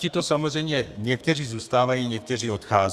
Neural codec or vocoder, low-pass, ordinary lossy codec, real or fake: codec, 32 kHz, 1.9 kbps, SNAC; 14.4 kHz; Opus, 64 kbps; fake